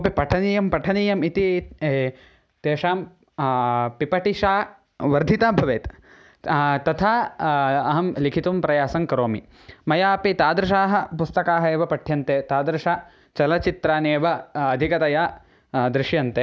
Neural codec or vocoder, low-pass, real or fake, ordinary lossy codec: none; none; real; none